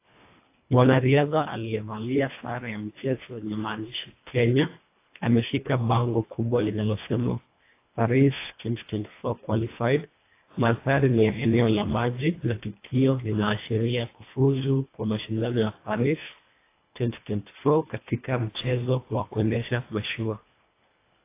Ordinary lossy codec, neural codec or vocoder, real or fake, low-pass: AAC, 24 kbps; codec, 24 kHz, 1.5 kbps, HILCodec; fake; 3.6 kHz